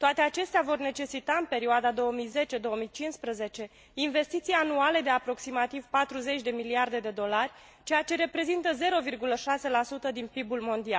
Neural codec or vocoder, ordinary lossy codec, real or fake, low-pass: none; none; real; none